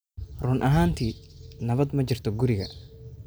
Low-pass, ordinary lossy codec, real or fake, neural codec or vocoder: none; none; real; none